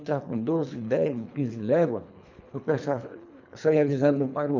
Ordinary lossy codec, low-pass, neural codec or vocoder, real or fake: none; 7.2 kHz; codec, 24 kHz, 3 kbps, HILCodec; fake